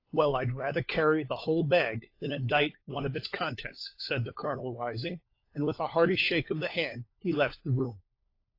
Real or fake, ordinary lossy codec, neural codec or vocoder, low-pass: fake; AAC, 32 kbps; codec, 16 kHz, 4 kbps, FunCodec, trained on LibriTTS, 50 frames a second; 5.4 kHz